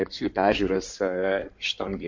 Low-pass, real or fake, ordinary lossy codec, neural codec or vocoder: 7.2 kHz; fake; MP3, 48 kbps; codec, 16 kHz in and 24 kHz out, 2.2 kbps, FireRedTTS-2 codec